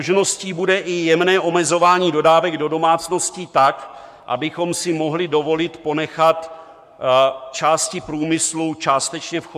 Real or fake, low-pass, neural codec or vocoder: fake; 14.4 kHz; codec, 44.1 kHz, 7.8 kbps, Pupu-Codec